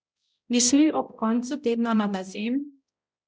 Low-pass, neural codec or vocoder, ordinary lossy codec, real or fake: none; codec, 16 kHz, 0.5 kbps, X-Codec, HuBERT features, trained on balanced general audio; none; fake